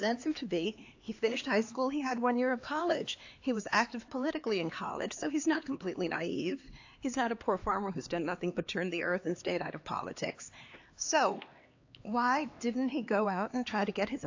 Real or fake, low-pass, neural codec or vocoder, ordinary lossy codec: fake; 7.2 kHz; codec, 16 kHz, 2 kbps, X-Codec, HuBERT features, trained on LibriSpeech; AAC, 48 kbps